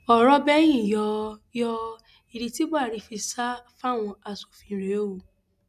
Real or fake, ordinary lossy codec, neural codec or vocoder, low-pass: real; none; none; 14.4 kHz